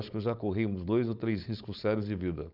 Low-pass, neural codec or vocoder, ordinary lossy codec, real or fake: 5.4 kHz; codec, 16 kHz, 4.8 kbps, FACodec; none; fake